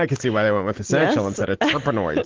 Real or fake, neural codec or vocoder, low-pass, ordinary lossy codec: real; none; 7.2 kHz; Opus, 16 kbps